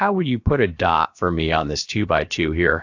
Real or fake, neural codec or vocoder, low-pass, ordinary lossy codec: fake; codec, 16 kHz, about 1 kbps, DyCAST, with the encoder's durations; 7.2 kHz; AAC, 48 kbps